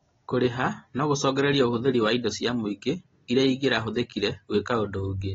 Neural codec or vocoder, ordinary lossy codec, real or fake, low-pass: none; AAC, 24 kbps; real; 7.2 kHz